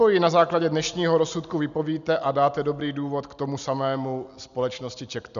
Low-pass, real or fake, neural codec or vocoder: 7.2 kHz; real; none